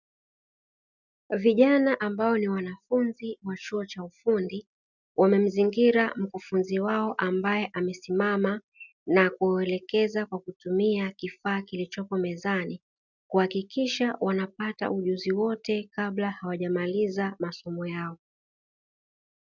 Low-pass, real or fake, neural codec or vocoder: 7.2 kHz; real; none